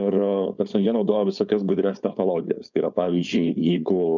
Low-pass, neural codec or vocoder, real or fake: 7.2 kHz; codec, 16 kHz, 4.8 kbps, FACodec; fake